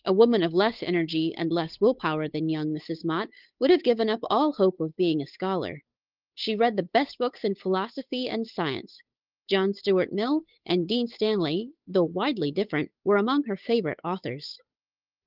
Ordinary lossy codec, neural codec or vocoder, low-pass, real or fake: Opus, 24 kbps; codec, 16 kHz, 8 kbps, FunCodec, trained on Chinese and English, 25 frames a second; 5.4 kHz; fake